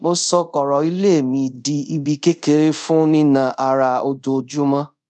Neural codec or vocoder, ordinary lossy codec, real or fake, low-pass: codec, 24 kHz, 0.5 kbps, DualCodec; none; fake; 10.8 kHz